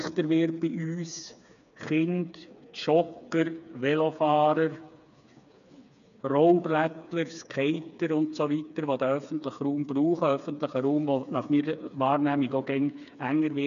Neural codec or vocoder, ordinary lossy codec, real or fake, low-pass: codec, 16 kHz, 4 kbps, FreqCodec, smaller model; none; fake; 7.2 kHz